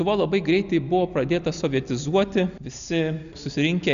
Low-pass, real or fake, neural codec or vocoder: 7.2 kHz; real; none